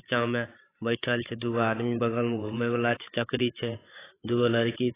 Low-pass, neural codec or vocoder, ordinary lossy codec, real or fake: 3.6 kHz; codec, 16 kHz, 8 kbps, FreqCodec, larger model; AAC, 16 kbps; fake